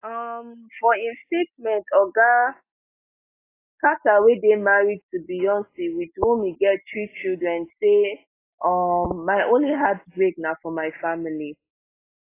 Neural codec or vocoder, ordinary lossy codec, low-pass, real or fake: none; AAC, 24 kbps; 3.6 kHz; real